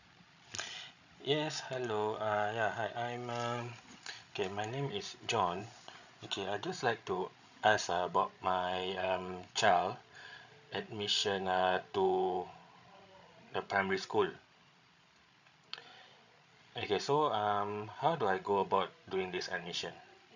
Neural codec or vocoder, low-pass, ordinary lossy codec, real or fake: codec, 16 kHz, 16 kbps, FreqCodec, larger model; 7.2 kHz; none; fake